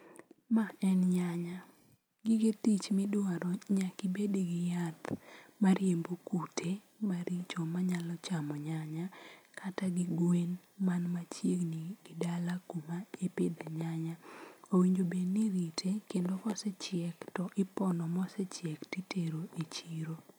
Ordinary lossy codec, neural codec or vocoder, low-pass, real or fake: none; none; none; real